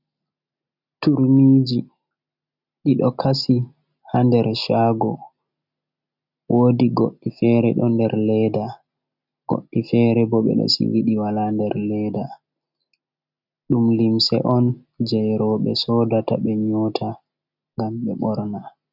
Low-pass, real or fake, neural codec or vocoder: 5.4 kHz; real; none